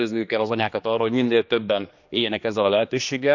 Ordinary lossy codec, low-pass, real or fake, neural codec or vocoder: none; 7.2 kHz; fake; codec, 16 kHz, 2 kbps, X-Codec, HuBERT features, trained on general audio